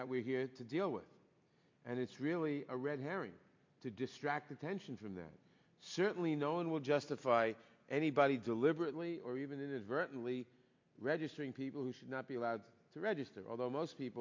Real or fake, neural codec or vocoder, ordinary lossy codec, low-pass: real; none; MP3, 48 kbps; 7.2 kHz